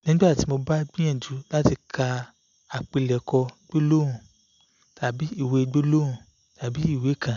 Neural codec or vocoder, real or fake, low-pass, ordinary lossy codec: none; real; 7.2 kHz; none